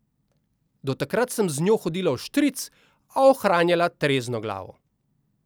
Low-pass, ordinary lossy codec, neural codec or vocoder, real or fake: none; none; none; real